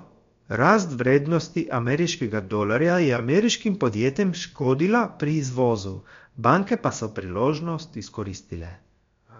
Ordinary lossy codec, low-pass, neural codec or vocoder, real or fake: MP3, 48 kbps; 7.2 kHz; codec, 16 kHz, about 1 kbps, DyCAST, with the encoder's durations; fake